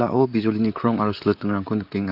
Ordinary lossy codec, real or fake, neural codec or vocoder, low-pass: AAC, 48 kbps; fake; vocoder, 44.1 kHz, 128 mel bands, Pupu-Vocoder; 5.4 kHz